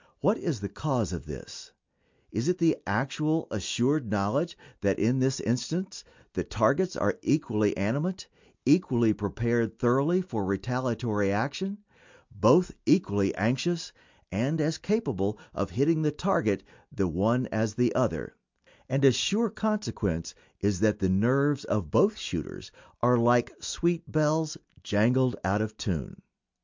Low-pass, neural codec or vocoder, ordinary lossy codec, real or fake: 7.2 kHz; none; MP3, 64 kbps; real